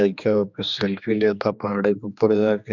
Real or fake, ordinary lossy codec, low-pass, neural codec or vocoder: fake; none; 7.2 kHz; codec, 16 kHz, 2 kbps, X-Codec, HuBERT features, trained on general audio